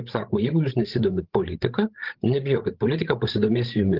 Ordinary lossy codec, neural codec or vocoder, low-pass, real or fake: Opus, 16 kbps; codec, 16 kHz, 16 kbps, FreqCodec, larger model; 5.4 kHz; fake